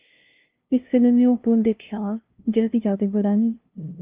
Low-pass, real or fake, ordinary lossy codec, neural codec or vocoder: 3.6 kHz; fake; Opus, 24 kbps; codec, 16 kHz, 0.5 kbps, FunCodec, trained on LibriTTS, 25 frames a second